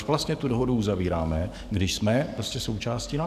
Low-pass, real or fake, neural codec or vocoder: 14.4 kHz; fake; codec, 44.1 kHz, 7.8 kbps, DAC